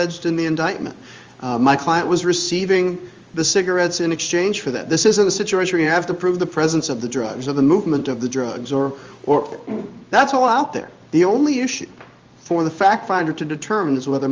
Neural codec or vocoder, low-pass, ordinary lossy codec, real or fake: codec, 16 kHz in and 24 kHz out, 1 kbps, XY-Tokenizer; 7.2 kHz; Opus, 32 kbps; fake